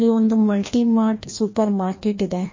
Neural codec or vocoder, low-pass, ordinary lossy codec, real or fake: codec, 16 kHz, 1 kbps, FreqCodec, larger model; 7.2 kHz; MP3, 32 kbps; fake